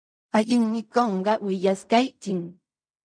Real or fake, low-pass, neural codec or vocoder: fake; 9.9 kHz; codec, 16 kHz in and 24 kHz out, 0.4 kbps, LongCat-Audio-Codec, fine tuned four codebook decoder